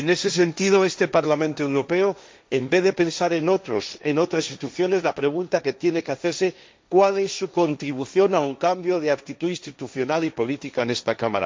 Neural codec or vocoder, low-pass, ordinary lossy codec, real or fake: codec, 16 kHz, 1.1 kbps, Voila-Tokenizer; none; none; fake